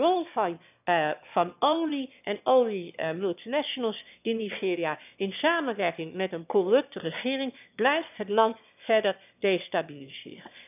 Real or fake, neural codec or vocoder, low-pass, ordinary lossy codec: fake; autoencoder, 22.05 kHz, a latent of 192 numbers a frame, VITS, trained on one speaker; 3.6 kHz; none